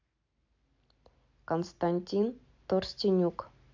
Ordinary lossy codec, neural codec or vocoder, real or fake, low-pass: AAC, 48 kbps; none; real; 7.2 kHz